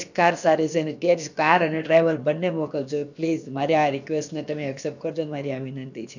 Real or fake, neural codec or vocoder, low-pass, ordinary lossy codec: fake; codec, 16 kHz, about 1 kbps, DyCAST, with the encoder's durations; 7.2 kHz; none